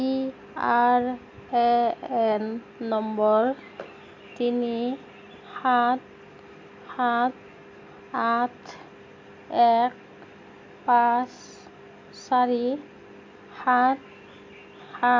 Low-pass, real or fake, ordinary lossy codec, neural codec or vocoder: 7.2 kHz; real; MP3, 48 kbps; none